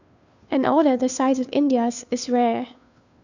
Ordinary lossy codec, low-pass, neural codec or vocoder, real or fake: none; 7.2 kHz; codec, 16 kHz, 2 kbps, FunCodec, trained on Chinese and English, 25 frames a second; fake